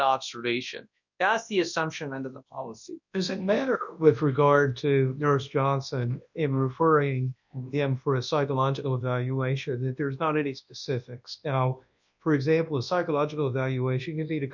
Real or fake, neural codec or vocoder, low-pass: fake; codec, 24 kHz, 0.9 kbps, WavTokenizer, large speech release; 7.2 kHz